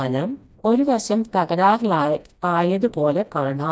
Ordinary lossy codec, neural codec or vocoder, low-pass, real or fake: none; codec, 16 kHz, 1 kbps, FreqCodec, smaller model; none; fake